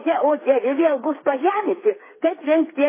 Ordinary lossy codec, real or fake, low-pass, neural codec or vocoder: MP3, 16 kbps; fake; 3.6 kHz; codec, 16 kHz, 4 kbps, FreqCodec, smaller model